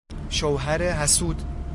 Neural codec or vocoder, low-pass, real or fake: none; 10.8 kHz; real